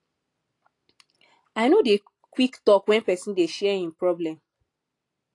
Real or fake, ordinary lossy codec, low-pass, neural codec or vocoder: real; AAC, 48 kbps; 10.8 kHz; none